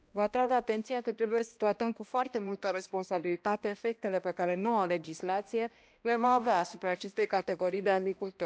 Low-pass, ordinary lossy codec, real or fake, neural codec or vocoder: none; none; fake; codec, 16 kHz, 1 kbps, X-Codec, HuBERT features, trained on balanced general audio